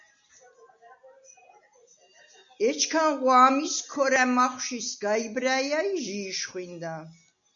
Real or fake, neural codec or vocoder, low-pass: real; none; 7.2 kHz